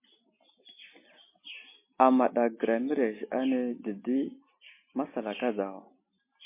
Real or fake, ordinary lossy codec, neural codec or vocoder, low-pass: real; MP3, 24 kbps; none; 3.6 kHz